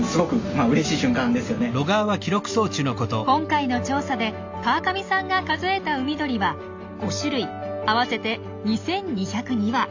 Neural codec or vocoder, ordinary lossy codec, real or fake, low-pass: none; AAC, 48 kbps; real; 7.2 kHz